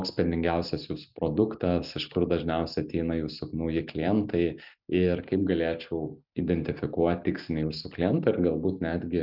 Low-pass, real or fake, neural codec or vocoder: 5.4 kHz; real; none